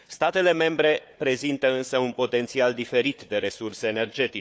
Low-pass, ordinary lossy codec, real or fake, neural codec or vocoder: none; none; fake; codec, 16 kHz, 4 kbps, FunCodec, trained on Chinese and English, 50 frames a second